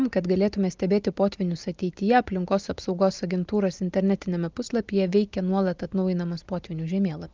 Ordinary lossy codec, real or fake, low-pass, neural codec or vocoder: Opus, 24 kbps; real; 7.2 kHz; none